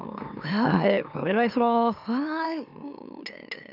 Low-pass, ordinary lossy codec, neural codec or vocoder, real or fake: 5.4 kHz; none; autoencoder, 44.1 kHz, a latent of 192 numbers a frame, MeloTTS; fake